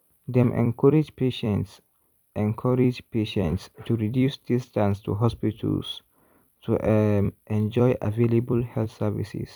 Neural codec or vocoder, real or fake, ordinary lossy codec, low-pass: vocoder, 44.1 kHz, 128 mel bands every 256 samples, BigVGAN v2; fake; none; 19.8 kHz